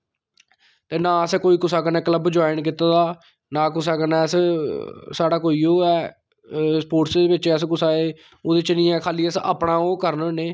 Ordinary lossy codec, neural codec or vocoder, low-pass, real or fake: none; none; none; real